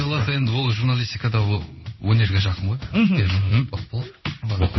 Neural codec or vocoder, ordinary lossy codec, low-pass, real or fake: codec, 16 kHz in and 24 kHz out, 1 kbps, XY-Tokenizer; MP3, 24 kbps; 7.2 kHz; fake